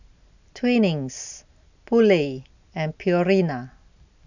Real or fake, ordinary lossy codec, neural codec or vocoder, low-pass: real; none; none; 7.2 kHz